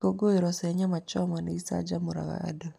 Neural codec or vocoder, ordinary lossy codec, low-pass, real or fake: none; none; 14.4 kHz; real